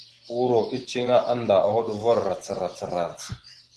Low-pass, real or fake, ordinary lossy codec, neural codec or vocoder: 10.8 kHz; fake; Opus, 16 kbps; vocoder, 24 kHz, 100 mel bands, Vocos